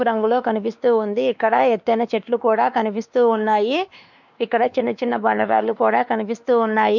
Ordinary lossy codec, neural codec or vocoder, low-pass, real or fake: none; codec, 16 kHz, 2 kbps, X-Codec, WavLM features, trained on Multilingual LibriSpeech; 7.2 kHz; fake